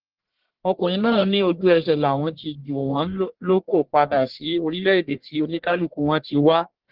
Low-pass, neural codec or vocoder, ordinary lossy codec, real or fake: 5.4 kHz; codec, 44.1 kHz, 1.7 kbps, Pupu-Codec; Opus, 16 kbps; fake